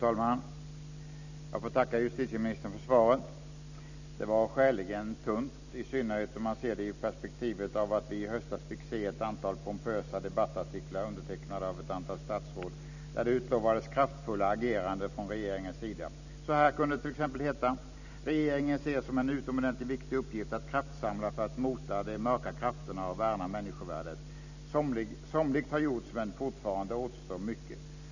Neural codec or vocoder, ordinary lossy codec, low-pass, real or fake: none; none; 7.2 kHz; real